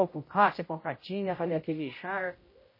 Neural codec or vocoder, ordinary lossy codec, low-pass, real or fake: codec, 16 kHz, 0.5 kbps, X-Codec, HuBERT features, trained on general audio; MP3, 24 kbps; 5.4 kHz; fake